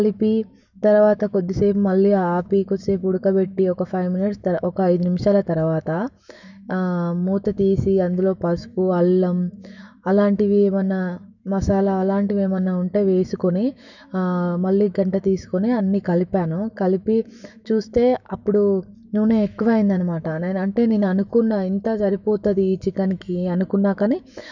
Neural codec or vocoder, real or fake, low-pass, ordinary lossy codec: autoencoder, 48 kHz, 128 numbers a frame, DAC-VAE, trained on Japanese speech; fake; 7.2 kHz; none